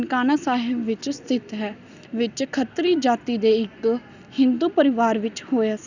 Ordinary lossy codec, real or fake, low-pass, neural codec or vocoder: none; real; 7.2 kHz; none